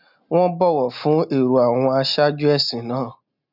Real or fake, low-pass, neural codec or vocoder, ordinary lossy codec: real; 5.4 kHz; none; none